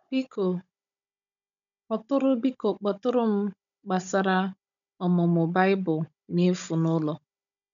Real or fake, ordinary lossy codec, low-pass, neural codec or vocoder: fake; none; 7.2 kHz; codec, 16 kHz, 16 kbps, FunCodec, trained on Chinese and English, 50 frames a second